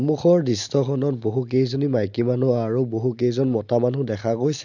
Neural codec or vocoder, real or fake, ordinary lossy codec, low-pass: none; real; none; 7.2 kHz